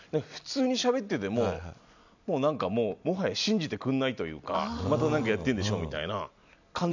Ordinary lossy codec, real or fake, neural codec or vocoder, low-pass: none; real; none; 7.2 kHz